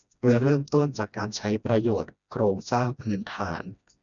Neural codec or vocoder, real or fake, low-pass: codec, 16 kHz, 1 kbps, FreqCodec, smaller model; fake; 7.2 kHz